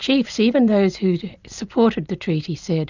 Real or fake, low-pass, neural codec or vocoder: real; 7.2 kHz; none